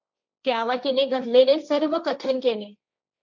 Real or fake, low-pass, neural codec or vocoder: fake; 7.2 kHz; codec, 16 kHz, 1.1 kbps, Voila-Tokenizer